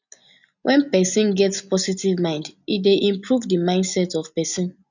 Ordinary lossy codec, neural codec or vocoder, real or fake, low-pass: none; none; real; 7.2 kHz